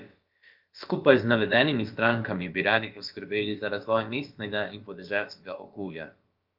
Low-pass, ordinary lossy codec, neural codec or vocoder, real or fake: 5.4 kHz; Opus, 32 kbps; codec, 16 kHz, about 1 kbps, DyCAST, with the encoder's durations; fake